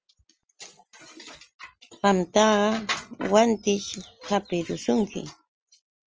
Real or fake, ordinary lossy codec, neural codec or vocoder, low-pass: real; Opus, 24 kbps; none; 7.2 kHz